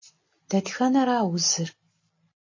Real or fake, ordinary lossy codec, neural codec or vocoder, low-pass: real; MP3, 32 kbps; none; 7.2 kHz